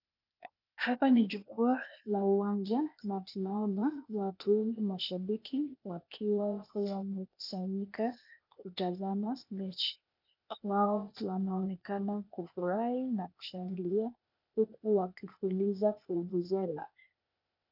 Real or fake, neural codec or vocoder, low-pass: fake; codec, 16 kHz, 0.8 kbps, ZipCodec; 5.4 kHz